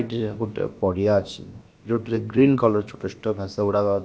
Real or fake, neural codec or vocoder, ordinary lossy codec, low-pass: fake; codec, 16 kHz, about 1 kbps, DyCAST, with the encoder's durations; none; none